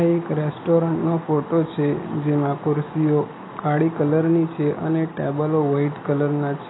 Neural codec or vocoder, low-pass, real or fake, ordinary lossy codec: none; 7.2 kHz; real; AAC, 16 kbps